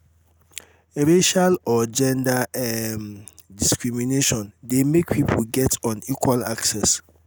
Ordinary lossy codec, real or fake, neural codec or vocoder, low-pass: none; real; none; none